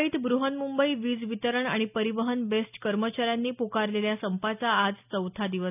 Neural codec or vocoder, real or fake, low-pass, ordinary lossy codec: none; real; 3.6 kHz; none